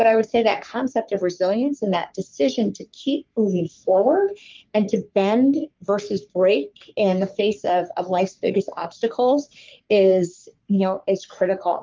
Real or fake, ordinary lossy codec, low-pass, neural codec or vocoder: fake; Opus, 32 kbps; 7.2 kHz; codec, 44.1 kHz, 3.4 kbps, Pupu-Codec